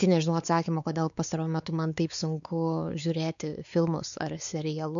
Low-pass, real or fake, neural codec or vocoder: 7.2 kHz; fake; codec, 16 kHz, 4 kbps, X-Codec, WavLM features, trained on Multilingual LibriSpeech